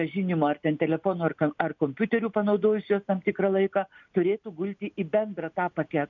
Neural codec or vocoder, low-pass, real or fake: none; 7.2 kHz; real